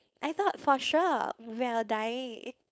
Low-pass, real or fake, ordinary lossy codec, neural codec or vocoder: none; fake; none; codec, 16 kHz, 4.8 kbps, FACodec